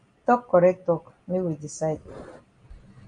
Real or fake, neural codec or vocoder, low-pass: real; none; 9.9 kHz